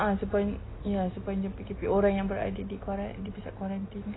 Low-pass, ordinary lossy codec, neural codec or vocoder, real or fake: 7.2 kHz; AAC, 16 kbps; none; real